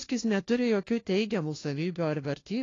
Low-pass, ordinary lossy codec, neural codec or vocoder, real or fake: 7.2 kHz; AAC, 32 kbps; codec, 16 kHz, 1 kbps, FunCodec, trained on LibriTTS, 50 frames a second; fake